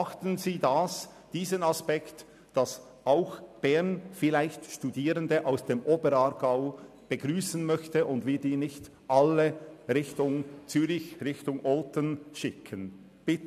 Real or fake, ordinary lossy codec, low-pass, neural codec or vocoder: real; none; 14.4 kHz; none